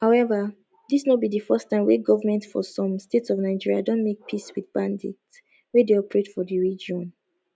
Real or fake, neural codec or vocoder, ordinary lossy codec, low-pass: real; none; none; none